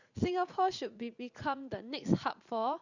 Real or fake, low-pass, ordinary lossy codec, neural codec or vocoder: fake; 7.2 kHz; Opus, 64 kbps; vocoder, 44.1 kHz, 80 mel bands, Vocos